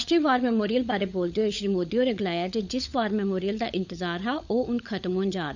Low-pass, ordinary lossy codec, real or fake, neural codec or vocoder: 7.2 kHz; none; fake; codec, 16 kHz, 16 kbps, FunCodec, trained on LibriTTS, 50 frames a second